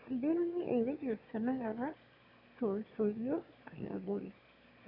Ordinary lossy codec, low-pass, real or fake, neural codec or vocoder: none; 5.4 kHz; fake; autoencoder, 22.05 kHz, a latent of 192 numbers a frame, VITS, trained on one speaker